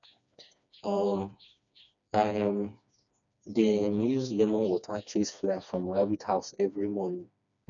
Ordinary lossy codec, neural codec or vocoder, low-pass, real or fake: none; codec, 16 kHz, 2 kbps, FreqCodec, smaller model; 7.2 kHz; fake